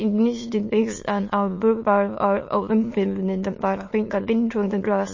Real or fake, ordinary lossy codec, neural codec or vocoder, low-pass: fake; MP3, 32 kbps; autoencoder, 22.05 kHz, a latent of 192 numbers a frame, VITS, trained on many speakers; 7.2 kHz